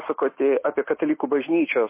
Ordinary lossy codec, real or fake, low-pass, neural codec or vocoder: MP3, 24 kbps; real; 3.6 kHz; none